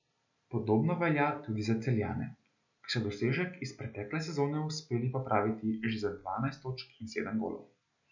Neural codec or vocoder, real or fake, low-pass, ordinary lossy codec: none; real; 7.2 kHz; none